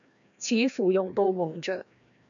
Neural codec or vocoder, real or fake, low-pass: codec, 16 kHz, 1 kbps, FreqCodec, larger model; fake; 7.2 kHz